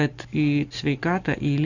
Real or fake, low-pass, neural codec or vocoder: real; 7.2 kHz; none